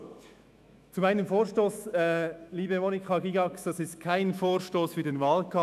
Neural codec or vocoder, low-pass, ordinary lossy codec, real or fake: autoencoder, 48 kHz, 128 numbers a frame, DAC-VAE, trained on Japanese speech; 14.4 kHz; none; fake